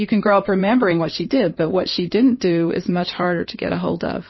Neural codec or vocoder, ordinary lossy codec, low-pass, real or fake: codec, 16 kHz in and 24 kHz out, 2.2 kbps, FireRedTTS-2 codec; MP3, 24 kbps; 7.2 kHz; fake